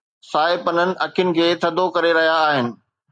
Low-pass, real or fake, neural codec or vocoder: 9.9 kHz; real; none